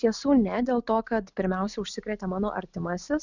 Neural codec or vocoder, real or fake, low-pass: none; real; 7.2 kHz